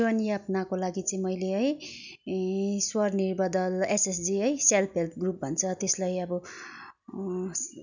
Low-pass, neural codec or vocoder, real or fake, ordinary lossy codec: 7.2 kHz; none; real; none